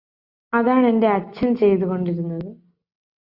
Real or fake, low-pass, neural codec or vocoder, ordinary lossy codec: real; 5.4 kHz; none; Opus, 64 kbps